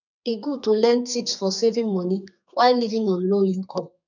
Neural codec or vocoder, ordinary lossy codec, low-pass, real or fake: codec, 32 kHz, 1.9 kbps, SNAC; none; 7.2 kHz; fake